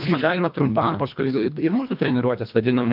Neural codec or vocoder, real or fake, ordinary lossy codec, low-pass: codec, 24 kHz, 1.5 kbps, HILCodec; fake; AAC, 48 kbps; 5.4 kHz